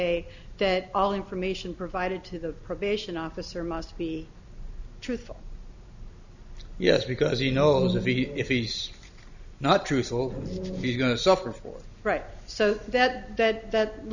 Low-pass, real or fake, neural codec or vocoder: 7.2 kHz; real; none